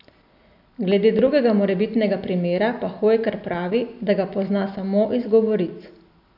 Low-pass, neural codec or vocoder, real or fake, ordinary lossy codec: 5.4 kHz; none; real; none